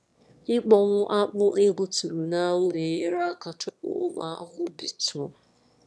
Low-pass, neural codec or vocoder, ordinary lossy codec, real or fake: none; autoencoder, 22.05 kHz, a latent of 192 numbers a frame, VITS, trained on one speaker; none; fake